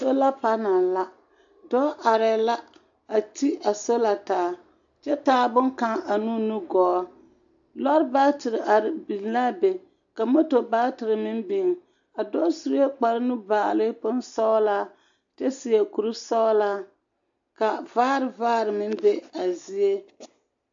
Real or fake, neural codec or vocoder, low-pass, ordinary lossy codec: real; none; 7.2 kHz; AAC, 64 kbps